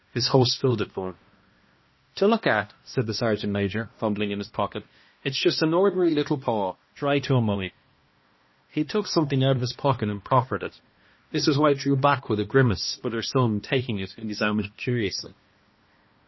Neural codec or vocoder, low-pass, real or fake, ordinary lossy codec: codec, 16 kHz, 1 kbps, X-Codec, HuBERT features, trained on balanced general audio; 7.2 kHz; fake; MP3, 24 kbps